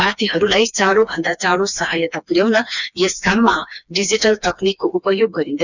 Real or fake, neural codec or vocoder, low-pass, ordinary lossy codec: fake; codec, 16 kHz, 2 kbps, FreqCodec, smaller model; 7.2 kHz; none